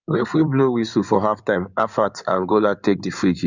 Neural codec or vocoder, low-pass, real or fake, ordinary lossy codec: codec, 16 kHz in and 24 kHz out, 2.2 kbps, FireRedTTS-2 codec; 7.2 kHz; fake; none